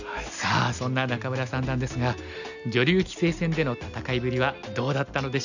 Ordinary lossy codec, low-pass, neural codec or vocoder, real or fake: none; 7.2 kHz; none; real